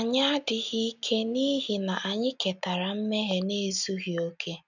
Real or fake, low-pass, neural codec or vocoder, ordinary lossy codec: real; 7.2 kHz; none; none